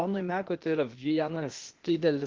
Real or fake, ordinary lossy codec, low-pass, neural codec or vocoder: fake; Opus, 16 kbps; 7.2 kHz; codec, 16 kHz, 0.8 kbps, ZipCodec